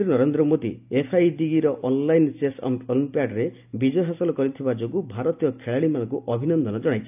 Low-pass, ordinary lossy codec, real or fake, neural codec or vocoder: 3.6 kHz; AAC, 32 kbps; real; none